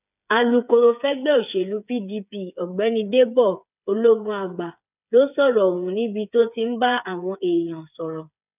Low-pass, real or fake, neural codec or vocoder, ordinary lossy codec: 3.6 kHz; fake; codec, 16 kHz, 8 kbps, FreqCodec, smaller model; none